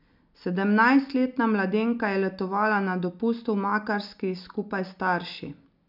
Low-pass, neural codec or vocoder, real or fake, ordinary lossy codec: 5.4 kHz; none; real; none